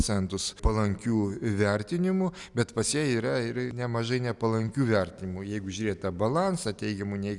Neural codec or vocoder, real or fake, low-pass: none; real; 10.8 kHz